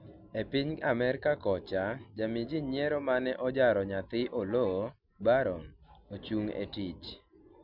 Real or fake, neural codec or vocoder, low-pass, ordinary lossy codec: real; none; 5.4 kHz; none